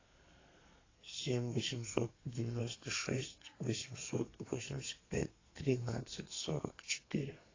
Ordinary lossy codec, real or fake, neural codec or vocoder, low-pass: AAC, 32 kbps; fake; codec, 32 kHz, 1.9 kbps, SNAC; 7.2 kHz